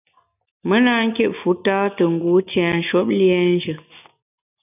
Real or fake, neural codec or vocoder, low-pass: real; none; 3.6 kHz